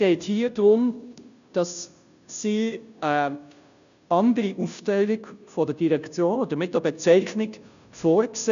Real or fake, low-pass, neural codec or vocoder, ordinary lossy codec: fake; 7.2 kHz; codec, 16 kHz, 0.5 kbps, FunCodec, trained on Chinese and English, 25 frames a second; none